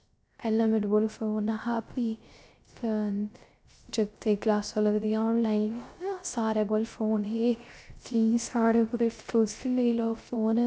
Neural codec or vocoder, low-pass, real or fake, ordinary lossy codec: codec, 16 kHz, 0.3 kbps, FocalCodec; none; fake; none